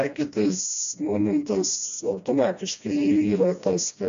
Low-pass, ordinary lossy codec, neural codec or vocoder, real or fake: 7.2 kHz; AAC, 64 kbps; codec, 16 kHz, 1 kbps, FreqCodec, smaller model; fake